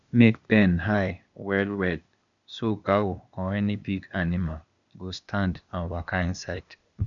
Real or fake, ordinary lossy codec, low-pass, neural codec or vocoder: fake; none; 7.2 kHz; codec, 16 kHz, 0.8 kbps, ZipCodec